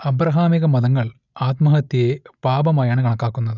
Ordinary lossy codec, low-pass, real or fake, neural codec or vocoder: none; 7.2 kHz; real; none